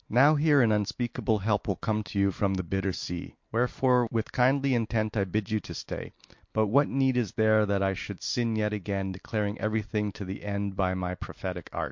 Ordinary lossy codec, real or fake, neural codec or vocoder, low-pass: MP3, 48 kbps; real; none; 7.2 kHz